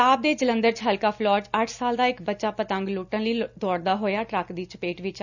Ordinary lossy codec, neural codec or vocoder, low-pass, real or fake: none; none; 7.2 kHz; real